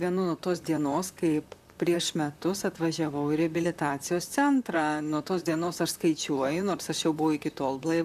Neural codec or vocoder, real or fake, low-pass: vocoder, 44.1 kHz, 128 mel bands, Pupu-Vocoder; fake; 14.4 kHz